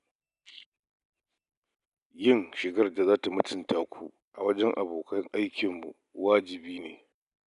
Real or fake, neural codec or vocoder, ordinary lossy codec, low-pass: real; none; none; 10.8 kHz